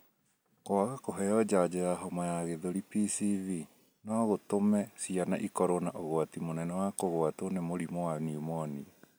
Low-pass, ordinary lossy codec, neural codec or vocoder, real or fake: none; none; none; real